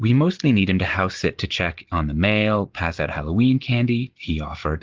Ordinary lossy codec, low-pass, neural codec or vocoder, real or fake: Opus, 16 kbps; 7.2 kHz; none; real